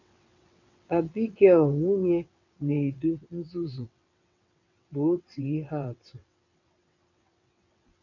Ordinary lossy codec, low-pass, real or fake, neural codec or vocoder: none; 7.2 kHz; fake; vocoder, 22.05 kHz, 80 mel bands, WaveNeXt